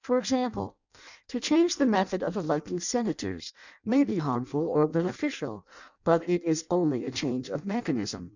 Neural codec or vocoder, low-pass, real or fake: codec, 16 kHz in and 24 kHz out, 0.6 kbps, FireRedTTS-2 codec; 7.2 kHz; fake